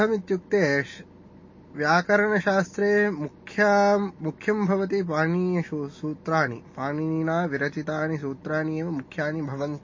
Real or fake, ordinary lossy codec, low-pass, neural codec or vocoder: real; MP3, 32 kbps; 7.2 kHz; none